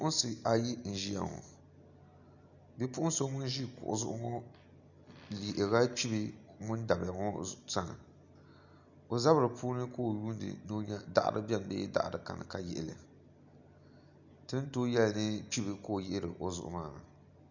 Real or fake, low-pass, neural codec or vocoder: real; 7.2 kHz; none